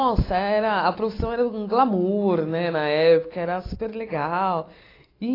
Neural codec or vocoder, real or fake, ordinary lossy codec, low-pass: none; real; AAC, 24 kbps; 5.4 kHz